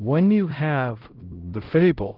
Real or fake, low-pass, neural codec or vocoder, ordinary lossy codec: fake; 5.4 kHz; codec, 16 kHz, 0.5 kbps, X-Codec, HuBERT features, trained on LibriSpeech; Opus, 16 kbps